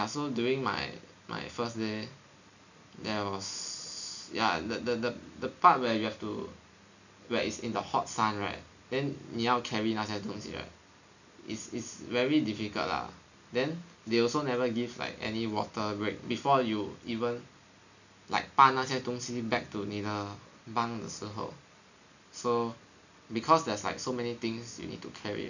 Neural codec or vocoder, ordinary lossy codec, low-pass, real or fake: none; none; 7.2 kHz; real